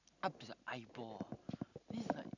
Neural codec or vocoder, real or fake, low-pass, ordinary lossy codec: none; real; 7.2 kHz; none